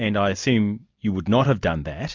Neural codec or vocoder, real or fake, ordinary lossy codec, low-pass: none; real; MP3, 64 kbps; 7.2 kHz